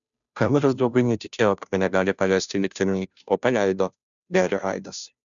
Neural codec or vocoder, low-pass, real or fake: codec, 16 kHz, 0.5 kbps, FunCodec, trained on Chinese and English, 25 frames a second; 7.2 kHz; fake